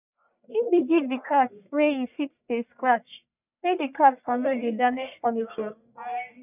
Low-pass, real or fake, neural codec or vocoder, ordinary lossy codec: 3.6 kHz; fake; codec, 44.1 kHz, 1.7 kbps, Pupu-Codec; none